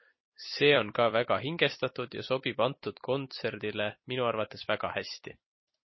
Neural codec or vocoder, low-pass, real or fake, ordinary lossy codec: vocoder, 44.1 kHz, 128 mel bands every 256 samples, BigVGAN v2; 7.2 kHz; fake; MP3, 24 kbps